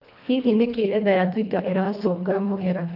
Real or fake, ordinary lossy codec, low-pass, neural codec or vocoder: fake; none; 5.4 kHz; codec, 24 kHz, 1.5 kbps, HILCodec